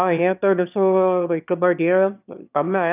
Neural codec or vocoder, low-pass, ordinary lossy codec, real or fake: autoencoder, 22.05 kHz, a latent of 192 numbers a frame, VITS, trained on one speaker; 3.6 kHz; none; fake